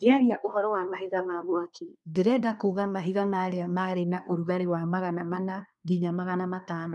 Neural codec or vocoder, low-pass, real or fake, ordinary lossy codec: codec, 24 kHz, 1 kbps, SNAC; none; fake; none